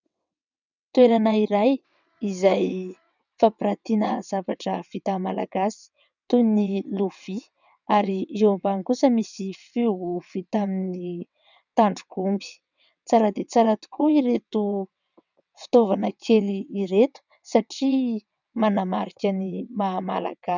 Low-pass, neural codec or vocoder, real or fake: 7.2 kHz; vocoder, 22.05 kHz, 80 mel bands, WaveNeXt; fake